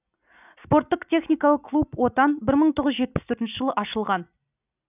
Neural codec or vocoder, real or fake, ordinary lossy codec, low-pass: none; real; none; 3.6 kHz